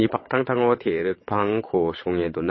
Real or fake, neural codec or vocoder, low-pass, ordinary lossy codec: fake; vocoder, 22.05 kHz, 80 mel bands, WaveNeXt; 7.2 kHz; MP3, 24 kbps